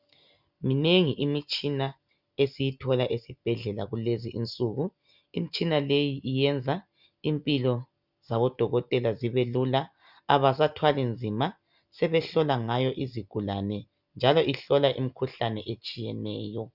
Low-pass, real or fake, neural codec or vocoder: 5.4 kHz; real; none